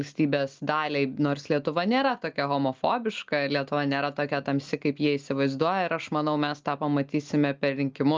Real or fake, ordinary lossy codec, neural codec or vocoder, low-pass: real; Opus, 24 kbps; none; 7.2 kHz